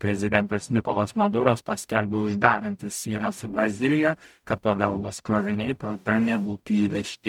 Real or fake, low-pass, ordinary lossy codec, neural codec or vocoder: fake; 19.8 kHz; MP3, 96 kbps; codec, 44.1 kHz, 0.9 kbps, DAC